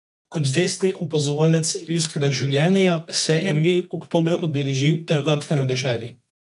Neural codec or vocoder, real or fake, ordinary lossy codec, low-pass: codec, 24 kHz, 0.9 kbps, WavTokenizer, medium music audio release; fake; none; 10.8 kHz